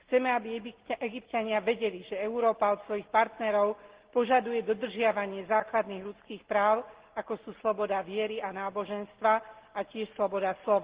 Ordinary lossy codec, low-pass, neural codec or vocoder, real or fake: Opus, 16 kbps; 3.6 kHz; none; real